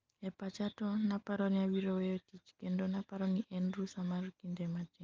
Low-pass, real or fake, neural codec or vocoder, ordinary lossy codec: 7.2 kHz; real; none; Opus, 24 kbps